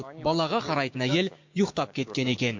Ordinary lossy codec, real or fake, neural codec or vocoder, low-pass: MP3, 48 kbps; fake; codec, 16 kHz, 6 kbps, DAC; 7.2 kHz